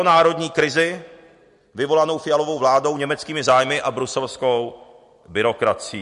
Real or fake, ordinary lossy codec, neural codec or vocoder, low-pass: real; MP3, 48 kbps; none; 14.4 kHz